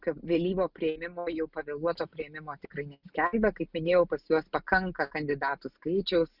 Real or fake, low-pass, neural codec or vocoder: real; 5.4 kHz; none